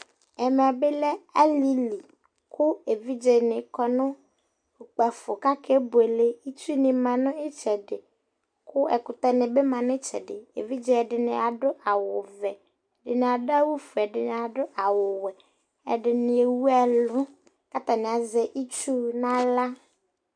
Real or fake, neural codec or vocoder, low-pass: real; none; 9.9 kHz